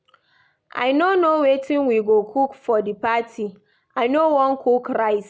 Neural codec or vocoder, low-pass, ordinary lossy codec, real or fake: none; none; none; real